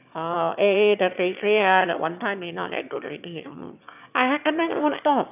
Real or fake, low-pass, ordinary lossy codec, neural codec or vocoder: fake; 3.6 kHz; none; autoencoder, 22.05 kHz, a latent of 192 numbers a frame, VITS, trained on one speaker